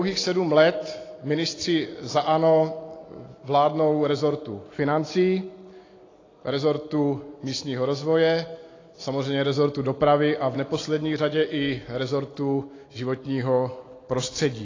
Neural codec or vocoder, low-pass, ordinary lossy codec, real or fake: none; 7.2 kHz; AAC, 32 kbps; real